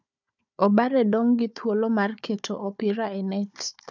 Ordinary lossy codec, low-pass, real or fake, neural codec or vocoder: none; 7.2 kHz; fake; codec, 16 kHz, 4 kbps, FunCodec, trained on Chinese and English, 50 frames a second